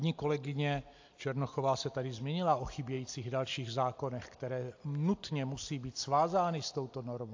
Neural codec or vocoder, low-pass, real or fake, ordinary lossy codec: none; 7.2 kHz; real; AAC, 48 kbps